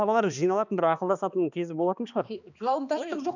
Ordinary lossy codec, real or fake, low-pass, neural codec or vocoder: none; fake; 7.2 kHz; codec, 16 kHz, 2 kbps, X-Codec, HuBERT features, trained on balanced general audio